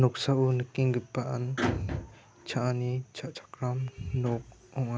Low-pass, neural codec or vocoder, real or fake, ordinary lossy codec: none; none; real; none